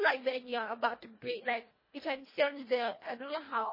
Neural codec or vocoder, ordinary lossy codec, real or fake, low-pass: codec, 24 kHz, 1.5 kbps, HILCodec; MP3, 24 kbps; fake; 5.4 kHz